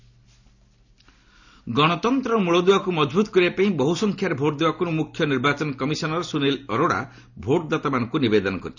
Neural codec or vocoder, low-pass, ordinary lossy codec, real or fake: none; 7.2 kHz; none; real